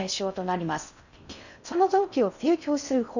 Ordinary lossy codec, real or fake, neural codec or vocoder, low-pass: none; fake; codec, 16 kHz in and 24 kHz out, 0.6 kbps, FocalCodec, streaming, 4096 codes; 7.2 kHz